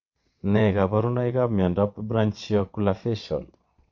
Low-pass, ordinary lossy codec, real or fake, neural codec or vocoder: 7.2 kHz; MP3, 48 kbps; fake; vocoder, 22.05 kHz, 80 mel bands, WaveNeXt